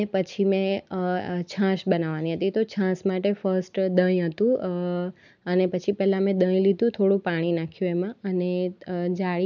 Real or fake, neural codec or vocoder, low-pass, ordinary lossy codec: real; none; 7.2 kHz; none